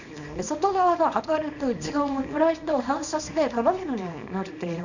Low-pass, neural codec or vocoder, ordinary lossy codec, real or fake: 7.2 kHz; codec, 24 kHz, 0.9 kbps, WavTokenizer, small release; none; fake